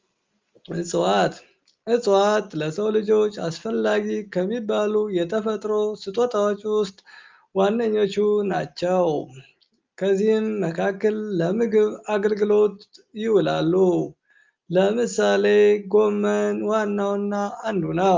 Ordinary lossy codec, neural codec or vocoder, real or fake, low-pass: Opus, 32 kbps; none; real; 7.2 kHz